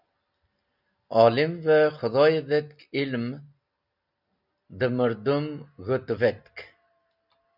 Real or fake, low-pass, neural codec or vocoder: real; 5.4 kHz; none